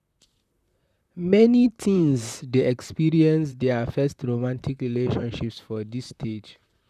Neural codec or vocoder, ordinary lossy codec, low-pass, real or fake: vocoder, 44.1 kHz, 128 mel bands, Pupu-Vocoder; none; 14.4 kHz; fake